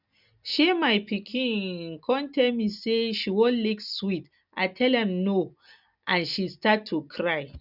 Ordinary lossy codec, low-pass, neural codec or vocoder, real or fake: none; 5.4 kHz; none; real